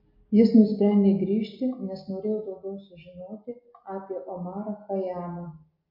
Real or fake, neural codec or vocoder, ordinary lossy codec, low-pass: real; none; MP3, 48 kbps; 5.4 kHz